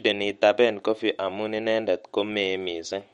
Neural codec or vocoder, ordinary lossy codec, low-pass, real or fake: autoencoder, 48 kHz, 128 numbers a frame, DAC-VAE, trained on Japanese speech; MP3, 48 kbps; 19.8 kHz; fake